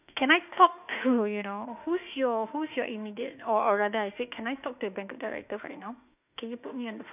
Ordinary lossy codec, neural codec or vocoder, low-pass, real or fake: none; autoencoder, 48 kHz, 32 numbers a frame, DAC-VAE, trained on Japanese speech; 3.6 kHz; fake